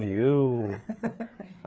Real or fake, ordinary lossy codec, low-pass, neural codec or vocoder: fake; none; none; codec, 16 kHz, 4 kbps, FreqCodec, larger model